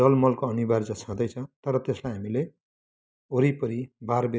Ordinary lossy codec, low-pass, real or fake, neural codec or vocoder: none; none; real; none